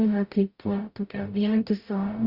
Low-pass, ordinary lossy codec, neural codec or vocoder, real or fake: 5.4 kHz; Opus, 64 kbps; codec, 44.1 kHz, 0.9 kbps, DAC; fake